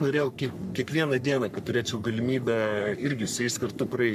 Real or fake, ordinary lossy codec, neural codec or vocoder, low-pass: fake; MP3, 96 kbps; codec, 44.1 kHz, 3.4 kbps, Pupu-Codec; 14.4 kHz